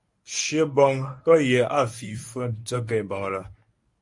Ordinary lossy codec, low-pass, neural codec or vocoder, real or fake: AAC, 64 kbps; 10.8 kHz; codec, 24 kHz, 0.9 kbps, WavTokenizer, medium speech release version 1; fake